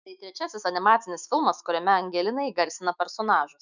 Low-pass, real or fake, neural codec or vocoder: 7.2 kHz; fake; autoencoder, 48 kHz, 128 numbers a frame, DAC-VAE, trained on Japanese speech